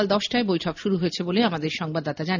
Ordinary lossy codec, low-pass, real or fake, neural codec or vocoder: none; 7.2 kHz; real; none